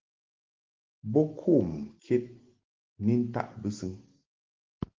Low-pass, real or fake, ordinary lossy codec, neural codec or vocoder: 7.2 kHz; real; Opus, 16 kbps; none